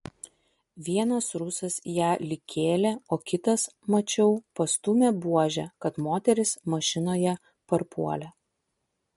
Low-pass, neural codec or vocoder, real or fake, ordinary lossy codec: 14.4 kHz; none; real; MP3, 48 kbps